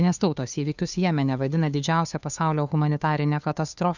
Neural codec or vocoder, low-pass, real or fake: codec, 16 kHz, 2 kbps, FunCodec, trained on Chinese and English, 25 frames a second; 7.2 kHz; fake